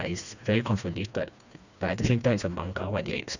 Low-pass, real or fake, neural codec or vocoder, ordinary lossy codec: 7.2 kHz; fake; codec, 16 kHz, 2 kbps, FreqCodec, smaller model; none